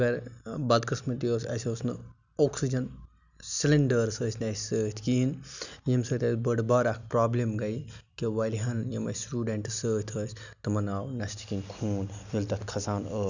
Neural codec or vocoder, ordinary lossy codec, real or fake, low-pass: none; none; real; 7.2 kHz